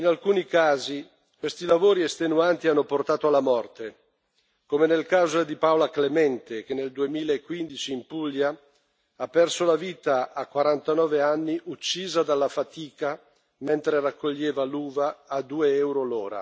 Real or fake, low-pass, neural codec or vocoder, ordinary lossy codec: real; none; none; none